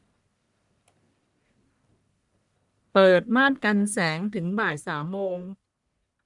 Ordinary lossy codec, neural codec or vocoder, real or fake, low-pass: none; codec, 44.1 kHz, 1.7 kbps, Pupu-Codec; fake; 10.8 kHz